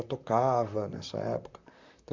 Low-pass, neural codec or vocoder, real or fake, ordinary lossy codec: 7.2 kHz; vocoder, 44.1 kHz, 128 mel bands, Pupu-Vocoder; fake; MP3, 64 kbps